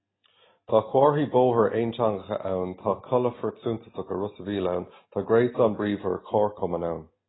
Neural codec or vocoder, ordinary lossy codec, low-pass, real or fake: none; AAC, 16 kbps; 7.2 kHz; real